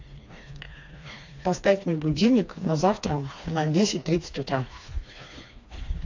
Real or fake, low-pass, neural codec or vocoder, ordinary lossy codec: fake; 7.2 kHz; codec, 16 kHz, 2 kbps, FreqCodec, smaller model; AAC, 48 kbps